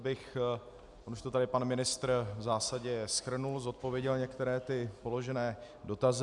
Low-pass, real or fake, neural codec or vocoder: 10.8 kHz; real; none